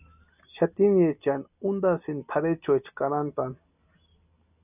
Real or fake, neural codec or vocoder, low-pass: real; none; 3.6 kHz